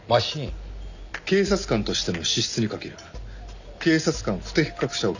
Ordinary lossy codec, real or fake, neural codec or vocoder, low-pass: none; real; none; 7.2 kHz